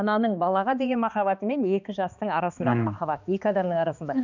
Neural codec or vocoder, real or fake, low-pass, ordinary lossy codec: codec, 16 kHz, 2 kbps, X-Codec, HuBERT features, trained on balanced general audio; fake; 7.2 kHz; none